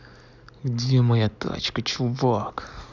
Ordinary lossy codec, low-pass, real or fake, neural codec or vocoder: none; 7.2 kHz; real; none